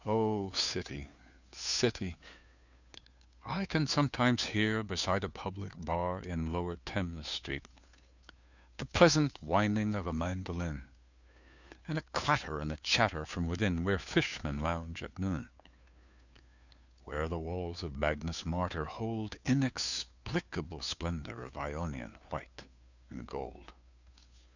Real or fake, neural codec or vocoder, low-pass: fake; codec, 16 kHz, 2 kbps, FunCodec, trained on Chinese and English, 25 frames a second; 7.2 kHz